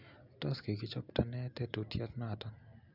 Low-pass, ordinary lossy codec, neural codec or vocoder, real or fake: 5.4 kHz; none; none; real